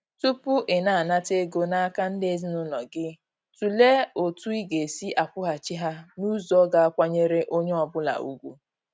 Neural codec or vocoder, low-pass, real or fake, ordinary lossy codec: none; none; real; none